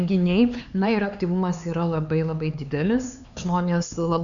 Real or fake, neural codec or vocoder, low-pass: fake; codec, 16 kHz, 4 kbps, X-Codec, HuBERT features, trained on LibriSpeech; 7.2 kHz